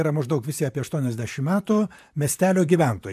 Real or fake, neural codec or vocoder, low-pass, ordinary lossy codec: fake; vocoder, 44.1 kHz, 128 mel bands, Pupu-Vocoder; 14.4 kHz; AAC, 96 kbps